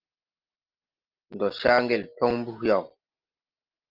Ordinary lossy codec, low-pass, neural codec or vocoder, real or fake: Opus, 24 kbps; 5.4 kHz; none; real